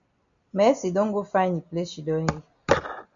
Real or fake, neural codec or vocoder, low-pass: real; none; 7.2 kHz